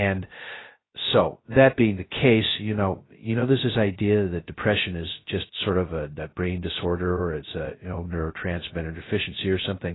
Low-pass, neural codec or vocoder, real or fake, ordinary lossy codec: 7.2 kHz; codec, 16 kHz, 0.2 kbps, FocalCodec; fake; AAC, 16 kbps